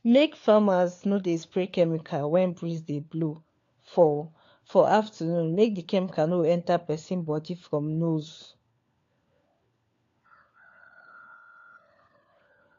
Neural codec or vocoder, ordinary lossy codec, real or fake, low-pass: codec, 16 kHz, 4 kbps, FunCodec, trained on LibriTTS, 50 frames a second; AAC, 48 kbps; fake; 7.2 kHz